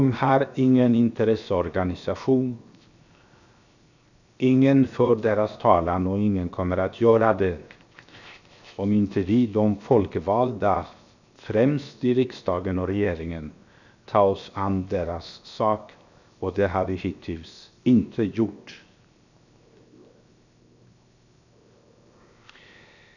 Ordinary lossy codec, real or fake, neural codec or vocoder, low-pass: none; fake; codec, 16 kHz, 0.7 kbps, FocalCodec; 7.2 kHz